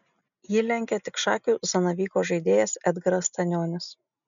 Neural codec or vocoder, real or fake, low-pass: none; real; 7.2 kHz